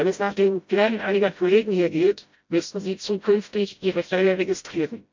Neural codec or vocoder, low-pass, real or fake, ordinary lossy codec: codec, 16 kHz, 0.5 kbps, FreqCodec, smaller model; 7.2 kHz; fake; MP3, 64 kbps